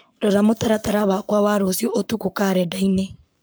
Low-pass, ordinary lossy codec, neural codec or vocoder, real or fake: none; none; codec, 44.1 kHz, 7.8 kbps, Pupu-Codec; fake